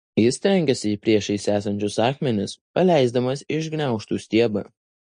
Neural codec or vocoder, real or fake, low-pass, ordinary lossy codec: none; real; 9.9 kHz; MP3, 48 kbps